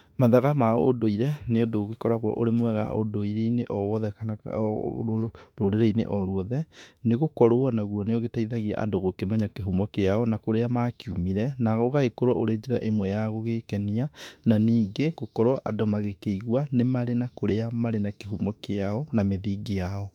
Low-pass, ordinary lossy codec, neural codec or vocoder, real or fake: 19.8 kHz; MP3, 96 kbps; autoencoder, 48 kHz, 32 numbers a frame, DAC-VAE, trained on Japanese speech; fake